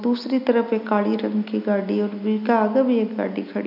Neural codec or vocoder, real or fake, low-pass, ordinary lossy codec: none; real; 5.4 kHz; none